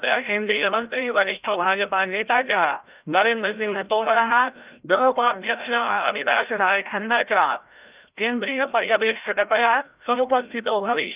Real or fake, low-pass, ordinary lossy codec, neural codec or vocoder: fake; 3.6 kHz; Opus, 24 kbps; codec, 16 kHz, 0.5 kbps, FreqCodec, larger model